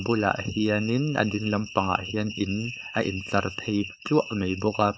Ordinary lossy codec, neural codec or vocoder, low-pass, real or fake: none; codec, 16 kHz, 4.8 kbps, FACodec; none; fake